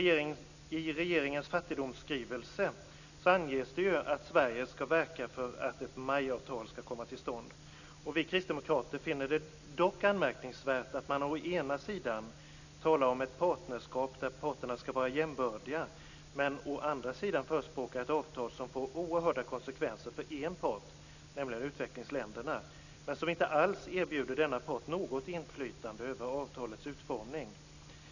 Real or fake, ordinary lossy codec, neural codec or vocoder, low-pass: real; none; none; 7.2 kHz